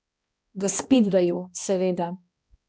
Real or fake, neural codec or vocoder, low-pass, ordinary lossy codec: fake; codec, 16 kHz, 1 kbps, X-Codec, HuBERT features, trained on balanced general audio; none; none